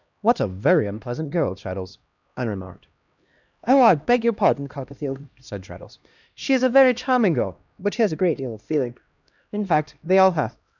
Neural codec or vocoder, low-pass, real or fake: codec, 16 kHz, 1 kbps, X-Codec, HuBERT features, trained on LibriSpeech; 7.2 kHz; fake